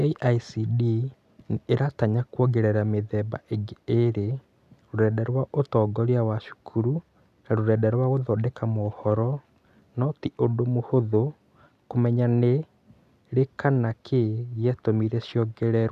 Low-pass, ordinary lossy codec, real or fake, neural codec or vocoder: 14.4 kHz; none; real; none